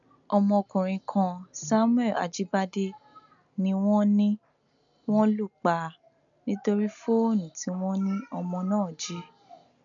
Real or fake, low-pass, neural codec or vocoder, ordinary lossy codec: real; 7.2 kHz; none; MP3, 96 kbps